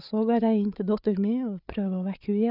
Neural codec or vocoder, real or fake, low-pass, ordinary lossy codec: codec, 16 kHz, 8 kbps, FreqCodec, larger model; fake; 5.4 kHz; none